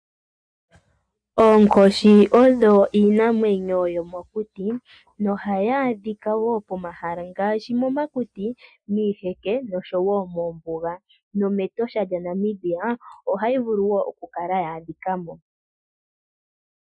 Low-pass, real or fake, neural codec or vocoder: 9.9 kHz; real; none